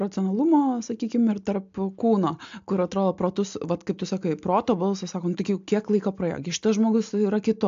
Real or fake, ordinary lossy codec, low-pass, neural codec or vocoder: real; AAC, 64 kbps; 7.2 kHz; none